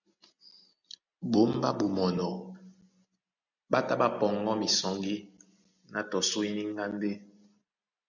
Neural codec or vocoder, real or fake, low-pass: none; real; 7.2 kHz